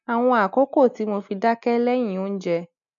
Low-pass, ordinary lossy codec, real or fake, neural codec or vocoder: 7.2 kHz; none; real; none